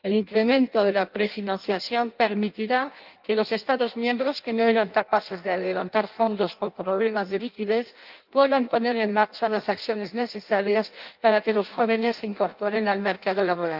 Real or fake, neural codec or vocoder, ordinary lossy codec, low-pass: fake; codec, 16 kHz in and 24 kHz out, 0.6 kbps, FireRedTTS-2 codec; Opus, 32 kbps; 5.4 kHz